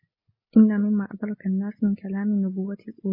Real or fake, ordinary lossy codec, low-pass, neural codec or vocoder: real; MP3, 24 kbps; 5.4 kHz; none